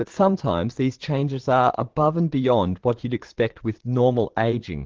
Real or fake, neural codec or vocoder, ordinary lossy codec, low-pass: fake; vocoder, 22.05 kHz, 80 mel bands, Vocos; Opus, 16 kbps; 7.2 kHz